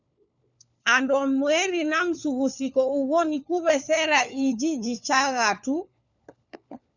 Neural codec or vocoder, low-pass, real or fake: codec, 16 kHz, 4 kbps, FunCodec, trained on LibriTTS, 50 frames a second; 7.2 kHz; fake